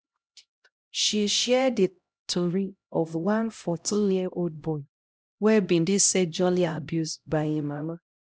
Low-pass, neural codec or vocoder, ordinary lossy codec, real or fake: none; codec, 16 kHz, 0.5 kbps, X-Codec, HuBERT features, trained on LibriSpeech; none; fake